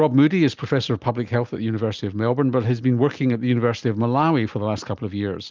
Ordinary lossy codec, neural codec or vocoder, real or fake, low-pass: Opus, 24 kbps; none; real; 7.2 kHz